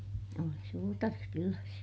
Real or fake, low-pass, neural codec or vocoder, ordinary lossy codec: real; none; none; none